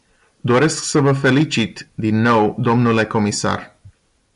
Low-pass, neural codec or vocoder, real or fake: 10.8 kHz; none; real